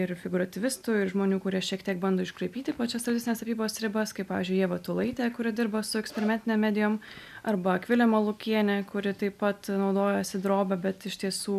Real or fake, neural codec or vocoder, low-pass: real; none; 14.4 kHz